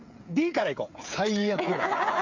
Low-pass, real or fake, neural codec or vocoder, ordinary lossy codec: 7.2 kHz; fake; codec, 16 kHz, 8 kbps, FreqCodec, smaller model; MP3, 64 kbps